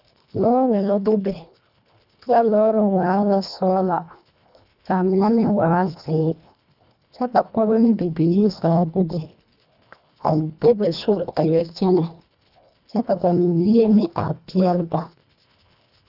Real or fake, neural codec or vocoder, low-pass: fake; codec, 24 kHz, 1.5 kbps, HILCodec; 5.4 kHz